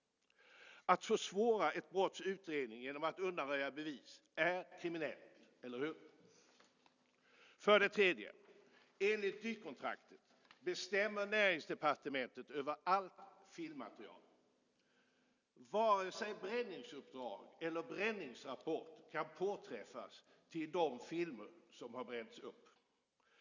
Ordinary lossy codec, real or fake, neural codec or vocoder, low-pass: none; real; none; 7.2 kHz